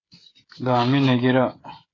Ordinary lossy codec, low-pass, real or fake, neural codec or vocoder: AAC, 32 kbps; 7.2 kHz; fake; codec, 16 kHz, 8 kbps, FreqCodec, smaller model